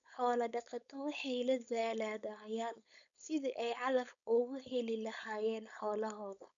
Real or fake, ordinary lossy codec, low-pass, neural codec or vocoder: fake; none; 7.2 kHz; codec, 16 kHz, 4.8 kbps, FACodec